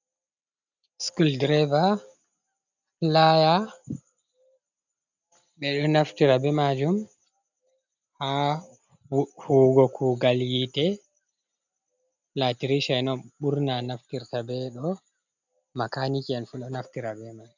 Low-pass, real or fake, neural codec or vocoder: 7.2 kHz; real; none